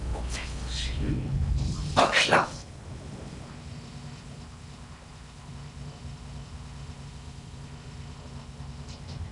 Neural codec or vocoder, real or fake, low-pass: codec, 16 kHz in and 24 kHz out, 0.6 kbps, FocalCodec, streaming, 4096 codes; fake; 10.8 kHz